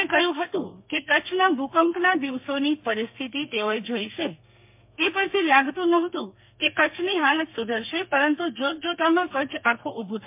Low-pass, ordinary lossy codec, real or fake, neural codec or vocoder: 3.6 kHz; MP3, 32 kbps; fake; codec, 32 kHz, 1.9 kbps, SNAC